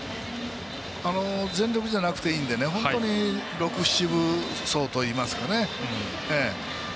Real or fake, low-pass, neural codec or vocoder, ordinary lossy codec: real; none; none; none